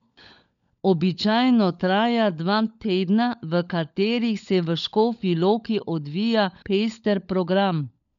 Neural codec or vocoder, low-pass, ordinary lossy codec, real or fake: codec, 16 kHz, 16 kbps, FunCodec, trained on LibriTTS, 50 frames a second; 7.2 kHz; none; fake